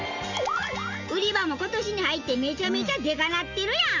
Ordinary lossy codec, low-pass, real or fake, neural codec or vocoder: none; 7.2 kHz; real; none